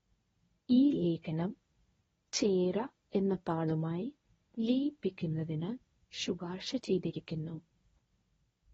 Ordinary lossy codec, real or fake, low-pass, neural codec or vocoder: AAC, 24 kbps; fake; 10.8 kHz; codec, 24 kHz, 0.9 kbps, WavTokenizer, medium speech release version 1